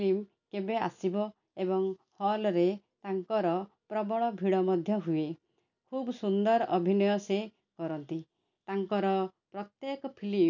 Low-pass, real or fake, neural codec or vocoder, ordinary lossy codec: 7.2 kHz; real; none; none